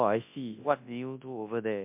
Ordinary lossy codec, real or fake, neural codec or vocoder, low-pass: MP3, 32 kbps; fake; codec, 24 kHz, 0.9 kbps, WavTokenizer, large speech release; 3.6 kHz